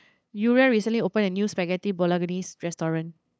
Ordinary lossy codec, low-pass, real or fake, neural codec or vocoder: none; none; fake; codec, 16 kHz, 8 kbps, FunCodec, trained on LibriTTS, 25 frames a second